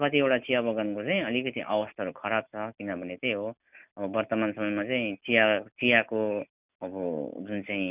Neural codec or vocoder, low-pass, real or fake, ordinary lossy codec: none; 3.6 kHz; real; none